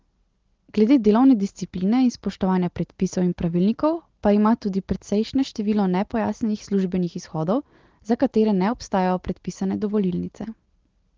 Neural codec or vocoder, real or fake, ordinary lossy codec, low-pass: none; real; Opus, 16 kbps; 7.2 kHz